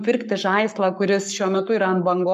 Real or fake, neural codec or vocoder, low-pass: fake; codec, 44.1 kHz, 7.8 kbps, Pupu-Codec; 14.4 kHz